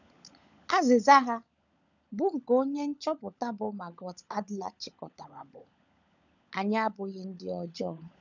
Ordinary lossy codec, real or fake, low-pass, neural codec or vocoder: none; fake; 7.2 kHz; codec, 16 kHz, 16 kbps, FunCodec, trained on LibriTTS, 50 frames a second